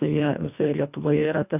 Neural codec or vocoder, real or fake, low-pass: codec, 24 kHz, 1.5 kbps, HILCodec; fake; 3.6 kHz